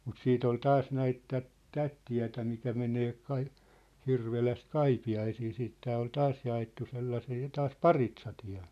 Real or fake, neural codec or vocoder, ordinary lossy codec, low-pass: real; none; none; 14.4 kHz